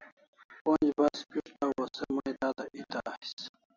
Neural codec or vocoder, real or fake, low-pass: none; real; 7.2 kHz